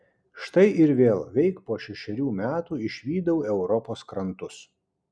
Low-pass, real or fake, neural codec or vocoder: 9.9 kHz; real; none